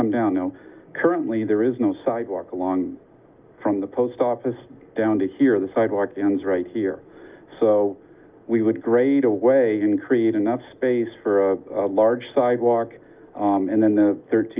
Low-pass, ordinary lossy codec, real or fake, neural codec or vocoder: 3.6 kHz; Opus, 32 kbps; real; none